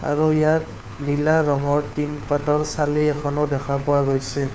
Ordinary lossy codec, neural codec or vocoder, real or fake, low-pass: none; codec, 16 kHz, 4 kbps, FunCodec, trained on LibriTTS, 50 frames a second; fake; none